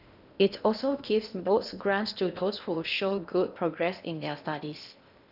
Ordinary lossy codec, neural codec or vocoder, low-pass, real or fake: none; codec, 16 kHz in and 24 kHz out, 0.8 kbps, FocalCodec, streaming, 65536 codes; 5.4 kHz; fake